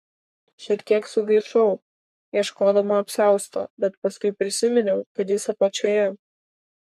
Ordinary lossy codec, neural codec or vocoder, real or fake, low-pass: MP3, 96 kbps; codec, 44.1 kHz, 3.4 kbps, Pupu-Codec; fake; 14.4 kHz